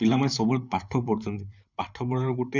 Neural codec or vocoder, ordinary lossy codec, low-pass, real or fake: vocoder, 44.1 kHz, 128 mel bands, Pupu-Vocoder; none; 7.2 kHz; fake